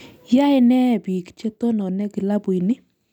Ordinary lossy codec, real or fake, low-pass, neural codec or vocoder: none; real; 19.8 kHz; none